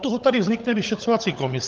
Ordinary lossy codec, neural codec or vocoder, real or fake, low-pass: Opus, 32 kbps; codec, 16 kHz, 16 kbps, FunCodec, trained on LibriTTS, 50 frames a second; fake; 7.2 kHz